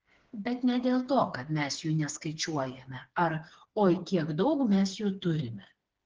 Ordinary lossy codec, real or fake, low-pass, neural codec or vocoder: Opus, 16 kbps; fake; 7.2 kHz; codec, 16 kHz, 4 kbps, FreqCodec, smaller model